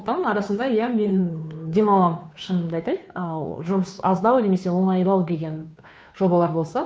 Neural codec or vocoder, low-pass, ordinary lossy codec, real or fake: codec, 16 kHz, 2 kbps, FunCodec, trained on Chinese and English, 25 frames a second; none; none; fake